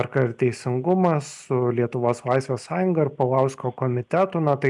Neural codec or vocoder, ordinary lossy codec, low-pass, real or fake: none; MP3, 96 kbps; 10.8 kHz; real